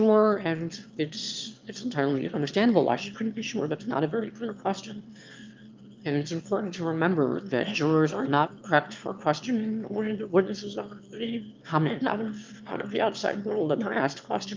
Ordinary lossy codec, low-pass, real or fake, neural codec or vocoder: Opus, 24 kbps; 7.2 kHz; fake; autoencoder, 22.05 kHz, a latent of 192 numbers a frame, VITS, trained on one speaker